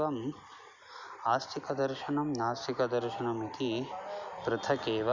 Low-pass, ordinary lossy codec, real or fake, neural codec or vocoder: 7.2 kHz; Opus, 64 kbps; real; none